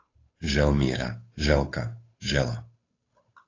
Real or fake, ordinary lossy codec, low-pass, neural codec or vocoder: fake; AAC, 32 kbps; 7.2 kHz; codec, 16 kHz, 8 kbps, FunCodec, trained on Chinese and English, 25 frames a second